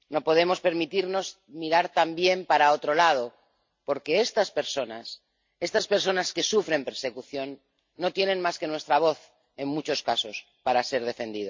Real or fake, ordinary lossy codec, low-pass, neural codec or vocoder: real; MP3, 48 kbps; 7.2 kHz; none